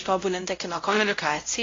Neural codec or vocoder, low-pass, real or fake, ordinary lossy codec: codec, 16 kHz, 0.5 kbps, X-Codec, WavLM features, trained on Multilingual LibriSpeech; 7.2 kHz; fake; AAC, 32 kbps